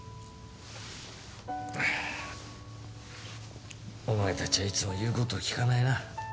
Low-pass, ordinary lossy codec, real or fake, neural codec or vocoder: none; none; real; none